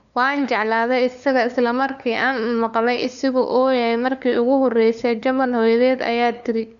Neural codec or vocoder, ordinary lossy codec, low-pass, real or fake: codec, 16 kHz, 2 kbps, FunCodec, trained on LibriTTS, 25 frames a second; none; 7.2 kHz; fake